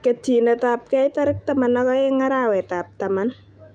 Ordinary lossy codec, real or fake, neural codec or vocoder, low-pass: none; fake; autoencoder, 48 kHz, 128 numbers a frame, DAC-VAE, trained on Japanese speech; 9.9 kHz